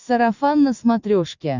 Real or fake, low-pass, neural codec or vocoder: real; 7.2 kHz; none